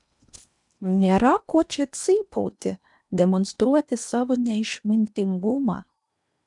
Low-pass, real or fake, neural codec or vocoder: 10.8 kHz; fake; codec, 16 kHz in and 24 kHz out, 0.8 kbps, FocalCodec, streaming, 65536 codes